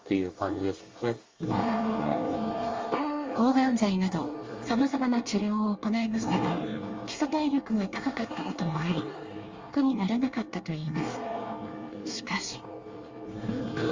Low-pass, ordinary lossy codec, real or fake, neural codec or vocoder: 7.2 kHz; Opus, 32 kbps; fake; codec, 24 kHz, 1 kbps, SNAC